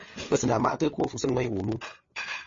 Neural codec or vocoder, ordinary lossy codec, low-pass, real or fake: codec, 16 kHz, 8 kbps, FreqCodec, larger model; MP3, 32 kbps; 7.2 kHz; fake